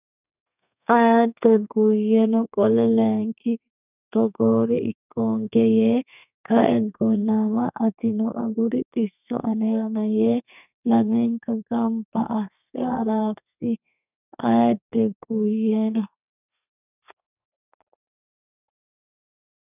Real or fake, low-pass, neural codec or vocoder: fake; 3.6 kHz; codec, 32 kHz, 1.9 kbps, SNAC